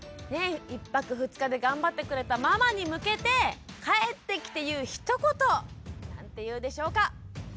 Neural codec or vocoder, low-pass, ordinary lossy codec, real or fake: none; none; none; real